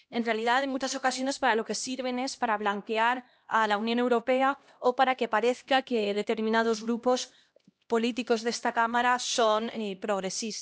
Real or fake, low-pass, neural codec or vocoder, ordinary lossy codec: fake; none; codec, 16 kHz, 1 kbps, X-Codec, HuBERT features, trained on LibriSpeech; none